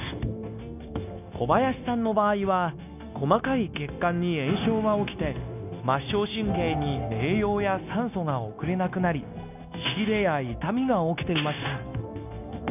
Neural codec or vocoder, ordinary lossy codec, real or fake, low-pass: codec, 16 kHz, 0.9 kbps, LongCat-Audio-Codec; none; fake; 3.6 kHz